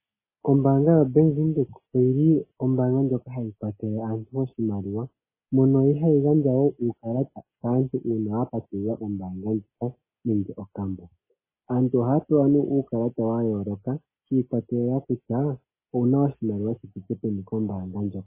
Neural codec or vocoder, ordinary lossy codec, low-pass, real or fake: none; MP3, 16 kbps; 3.6 kHz; real